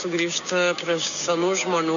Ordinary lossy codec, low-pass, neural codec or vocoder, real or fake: MP3, 96 kbps; 7.2 kHz; codec, 16 kHz, 6 kbps, DAC; fake